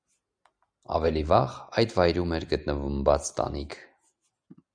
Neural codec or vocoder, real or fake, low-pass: none; real; 9.9 kHz